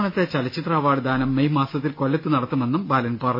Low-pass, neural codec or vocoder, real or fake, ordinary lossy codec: 5.4 kHz; none; real; MP3, 24 kbps